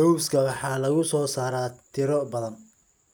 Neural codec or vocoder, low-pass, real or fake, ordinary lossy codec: none; none; real; none